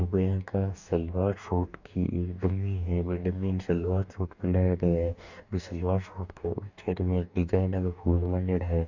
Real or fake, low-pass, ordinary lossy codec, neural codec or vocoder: fake; 7.2 kHz; none; codec, 44.1 kHz, 2.6 kbps, DAC